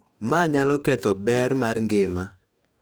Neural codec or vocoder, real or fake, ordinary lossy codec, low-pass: codec, 44.1 kHz, 2.6 kbps, DAC; fake; none; none